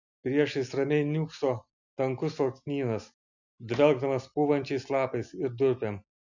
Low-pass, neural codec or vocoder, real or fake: 7.2 kHz; none; real